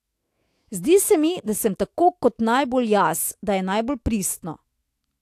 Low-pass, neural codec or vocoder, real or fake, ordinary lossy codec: 14.4 kHz; autoencoder, 48 kHz, 128 numbers a frame, DAC-VAE, trained on Japanese speech; fake; AAC, 64 kbps